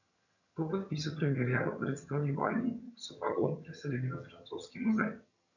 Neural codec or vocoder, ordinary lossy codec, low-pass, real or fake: vocoder, 22.05 kHz, 80 mel bands, HiFi-GAN; none; 7.2 kHz; fake